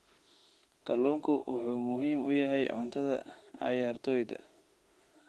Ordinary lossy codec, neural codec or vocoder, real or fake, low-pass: Opus, 24 kbps; autoencoder, 48 kHz, 32 numbers a frame, DAC-VAE, trained on Japanese speech; fake; 19.8 kHz